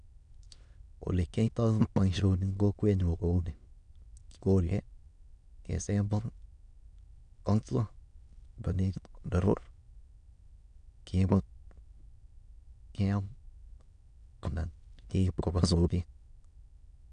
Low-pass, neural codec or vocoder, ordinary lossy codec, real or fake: 9.9 kHz; autoencoder, 22.05 kHz, a latent of 192 numbers a frame, VITS, trained on many speakers; none; fake